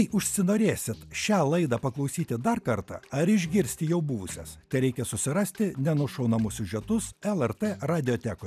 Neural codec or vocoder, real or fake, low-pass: none; real; 14.4 kHz